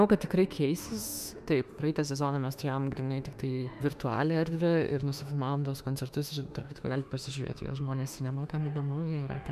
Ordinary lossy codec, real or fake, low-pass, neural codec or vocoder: MP3, 96 kbps; fake; 14.4 kHz; autoencoder, 48 kHz, 32 numbers a frame, DAC-VAE, trained on Japanese speech